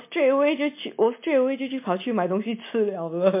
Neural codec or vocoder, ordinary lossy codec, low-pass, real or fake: none; MP3, 24 kbps; 3.6 kHz; real